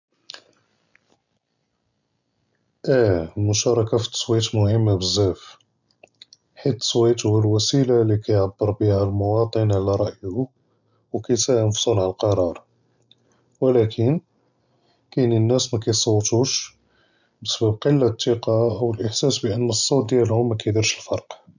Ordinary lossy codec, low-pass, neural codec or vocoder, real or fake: none; 7.2 kHz; none; real